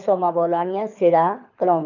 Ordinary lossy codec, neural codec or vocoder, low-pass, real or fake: none; codec, 24 kHz, 6 kbps, HILCodec; 7.2 kHz; fake